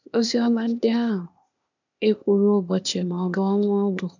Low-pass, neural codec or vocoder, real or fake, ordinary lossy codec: 7.2 kHz; codec, 16 kHz, 0.8 kbps, ZipCodec; fake; none